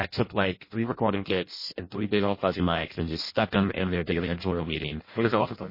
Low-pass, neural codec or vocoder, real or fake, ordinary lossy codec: 5.4 kHz; codec, 16 kHz in and 24 kHz out, 0.6 kbps, FireRedTTS-2 codec; fake; MP3, 24 kbps